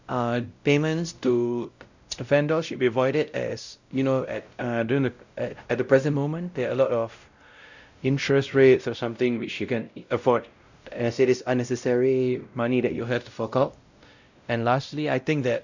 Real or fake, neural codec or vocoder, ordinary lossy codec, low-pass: fake; codec, 16 kHz, 0.5 kbps, X-Codec, WavLM features, trained on Multilingual LibriSpeech; none; 7.2 kHz